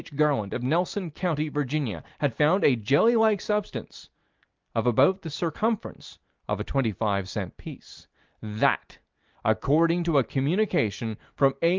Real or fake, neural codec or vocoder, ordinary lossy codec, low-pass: real; none; Opus, 32 kbps; 7.2 kHz